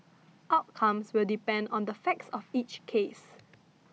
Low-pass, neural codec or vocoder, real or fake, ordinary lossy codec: none; none; real; none